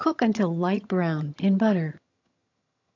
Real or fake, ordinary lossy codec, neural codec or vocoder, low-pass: fake; AAC, 48 kbps; vocoder, 22.05 kHz, 80 mel bands, HiFi-GAN; 7.2 kHz